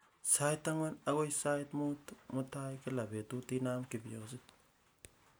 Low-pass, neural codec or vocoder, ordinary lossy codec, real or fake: none; none; none; real